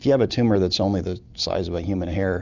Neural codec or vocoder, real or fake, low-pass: none; real; 7.2 kHz